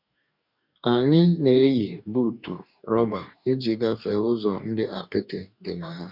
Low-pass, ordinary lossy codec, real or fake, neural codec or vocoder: 5.4 kHz; none; fake; codec, 44.1 kHz, 2.6 kbps, DAC